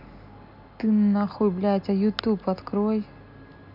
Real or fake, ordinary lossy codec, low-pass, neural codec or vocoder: real; none; 5.4 kHz; none